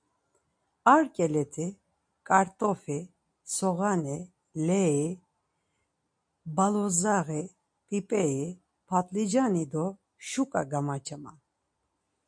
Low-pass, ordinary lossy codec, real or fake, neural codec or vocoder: 9.9 kHz; MP3, 64 kbps; real; none